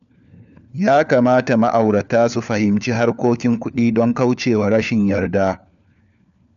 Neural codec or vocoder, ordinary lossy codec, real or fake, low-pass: codec, 16 kHz, 4 kbps, FunCodec, trained on LibriTTS, 50 frames a second; none; fake; 7.2 kHz